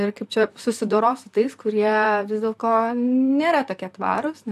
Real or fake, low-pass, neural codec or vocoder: fake; 14.4 kHz; vocoder, 44.1 kHz, 128 mel bands, Pupu-Vocoder